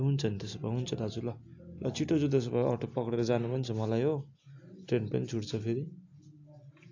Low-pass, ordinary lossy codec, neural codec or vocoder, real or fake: 7.2 kHz; AAC, 48 kbps; none; real